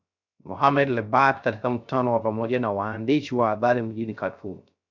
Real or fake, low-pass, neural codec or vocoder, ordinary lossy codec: fake; 7.2 kHz; codec, 16 kHz, 0.3 kbps, FocalCodec; MP3, 64 kbps